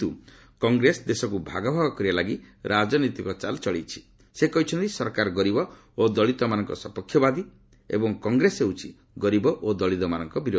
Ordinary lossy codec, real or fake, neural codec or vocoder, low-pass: none; real; none; none